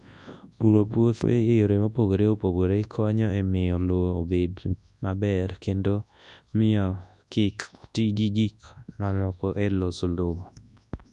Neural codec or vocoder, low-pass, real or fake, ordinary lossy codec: codec, 24 kHz, 0.9 kbps, WavTokenizer, large speech release; 10.8 kHz; fake; none